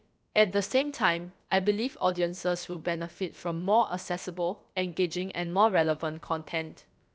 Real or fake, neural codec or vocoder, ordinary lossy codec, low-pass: fake; codec, 16 kHz, about 1 kbps, DyCAST, with the encoder's durations; none; none